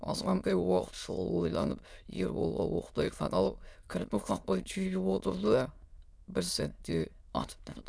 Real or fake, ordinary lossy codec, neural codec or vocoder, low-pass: fake; none; autoencoder, 22.05 kHz, a latent of 192 numbers a frame, VITS, trained on many speakers; none